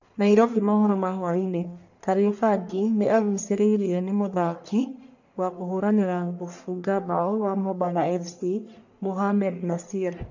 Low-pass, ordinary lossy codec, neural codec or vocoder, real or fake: 7.2 kHz; none; codec, 44.1 kHz, 1.7 kbps, Pupu-Codec; fake